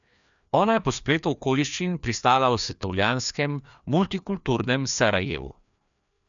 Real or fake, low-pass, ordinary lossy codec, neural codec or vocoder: fake; 7.2 kHz; none; codec, 16 kHz, 2 kbps, FreqCodec, larger model